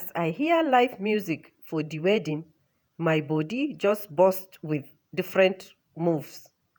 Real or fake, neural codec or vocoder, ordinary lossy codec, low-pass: fake; vocoder, 48 kHz, 128 mel bands, Vocos; none; none